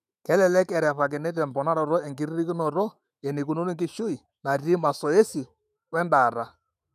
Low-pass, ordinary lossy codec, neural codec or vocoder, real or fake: 14.4 kHz; none; autoencoder, 48 kHz, 128 numbers a frame, DAC-VAE, trained on Japanese speech; fake